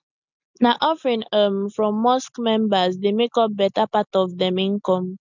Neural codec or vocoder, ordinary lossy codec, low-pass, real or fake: none; none; 7.2 kHz; real